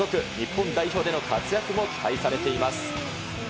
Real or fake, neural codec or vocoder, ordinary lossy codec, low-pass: real; none; none; none